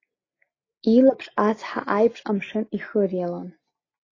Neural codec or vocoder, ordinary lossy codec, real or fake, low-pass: none; AAC, 32 kbps; real; 7.2 kHz